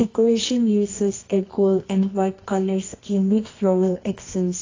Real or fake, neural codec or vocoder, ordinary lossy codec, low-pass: fake; codec, 24 kHz, 0.9 kbps, WavTokenizer, medium music audio release; AAC, 32 kbps; 7.2 kHz